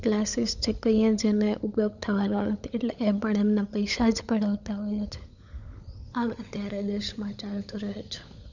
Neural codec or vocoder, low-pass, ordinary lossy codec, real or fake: codec, 16 kHz, 16 kbps, FunCodec, trained on LibriTTS, 50 frames a second; 7.2 kHz; none; fake